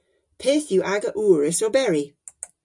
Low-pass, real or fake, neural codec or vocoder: 10.8 kHz; real; none